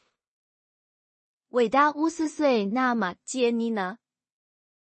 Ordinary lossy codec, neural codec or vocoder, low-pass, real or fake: MP3, 32 kbps; codec, 16 kHz in and 24 kHz out, 0.4 kbps, LongCat-Audio-Codec, two codebook decoder; 10.8 kHz; fake